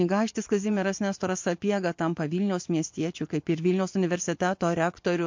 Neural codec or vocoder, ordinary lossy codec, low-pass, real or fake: vocoder, 24 kHz, 100 mel bands, Vocos; MP3, 48 kbps; 7.2 kHz; fake